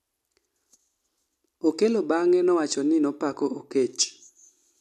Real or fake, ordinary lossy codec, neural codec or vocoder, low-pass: real; none; none; 14.4 kHz